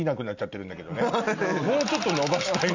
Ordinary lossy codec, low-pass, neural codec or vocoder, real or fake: none; 7.2 kHz; none; real